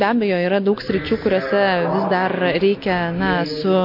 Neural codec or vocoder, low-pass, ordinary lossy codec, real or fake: none; 5.4 kHz; MP3, 32 kbps; real